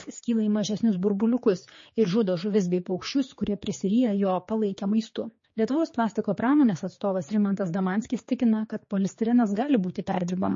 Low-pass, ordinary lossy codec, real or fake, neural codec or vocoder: 7.2 kHz; MP3, 32 kbps; fake; codec, 16 kHz, 4 kbps, X-Codec, HuBERT features, trained on general audio